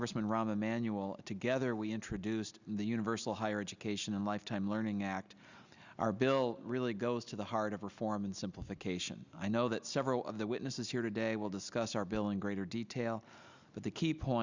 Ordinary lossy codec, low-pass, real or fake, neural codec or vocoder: Opus, 64 kbps; 7.2 kHz; real; none